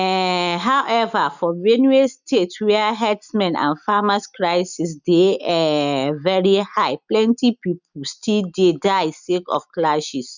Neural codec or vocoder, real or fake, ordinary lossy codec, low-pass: none; real; none; 7.2 kHz